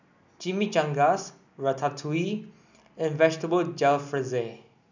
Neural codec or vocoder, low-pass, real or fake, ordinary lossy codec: none; 7.2 kHz; real; none